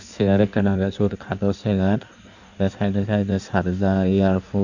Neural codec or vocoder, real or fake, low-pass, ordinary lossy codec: codec, 16 kHz in and 24 kHz out, 1.1 kbps, FireRedTTS-2 codec; fake; 7.2 kHz; none